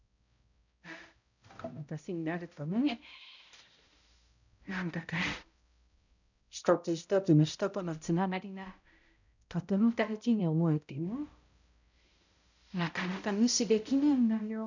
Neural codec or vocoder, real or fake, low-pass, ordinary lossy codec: codec, 16 kHz, 0.5 kbps, X-Codec, HuBERT features, trained on balanced general audio; fake; 7.2 kHz; none